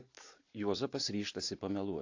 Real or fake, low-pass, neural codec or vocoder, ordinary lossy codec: real; 7.2 kHz; none; AAC, 48 kbps